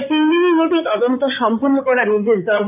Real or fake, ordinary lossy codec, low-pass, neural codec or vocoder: fake; none; 3.6 kHz; codec, 16 kHz in and 24 kHz out, 2.2 kbps, FireRedTTS-2 codec